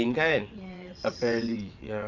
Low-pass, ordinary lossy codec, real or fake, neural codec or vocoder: 7.2 kHz; none; fake; vocoder, 22.05 kHz, 80 mel bands, WaveNeXt